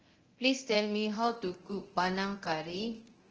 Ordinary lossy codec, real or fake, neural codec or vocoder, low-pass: Opus, 16 kbps; fake; codec, 24 kHz, 0.9 kbps, DualCodec; 7.2 kHz